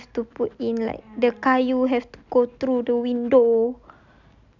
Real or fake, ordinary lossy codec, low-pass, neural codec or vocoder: real; none; 7.2 kHz; none